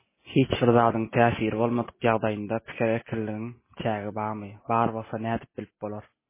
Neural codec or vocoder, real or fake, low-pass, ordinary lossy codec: none; real; 3.6 kHz; MP3, 16 kbps